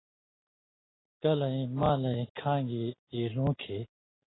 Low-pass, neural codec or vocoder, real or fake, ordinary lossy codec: 7.2 kHz; none; real; AAC, 16 kbps